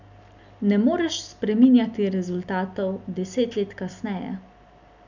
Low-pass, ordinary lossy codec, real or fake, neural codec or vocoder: 7.2 kHz; none; real; none